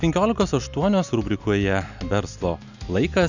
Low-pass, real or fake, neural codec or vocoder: 7.2 kHz; real; none